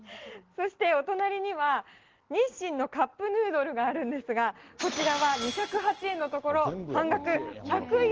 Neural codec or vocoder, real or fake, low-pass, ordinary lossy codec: none; real; 7.2 kHz; Opus, 16 kbps